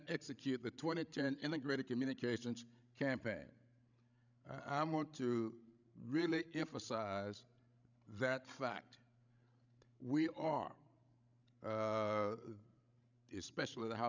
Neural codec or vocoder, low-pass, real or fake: codec, 16 kHz, 16 kbps, FreqCodec, larger model; 7.2 kHz; fake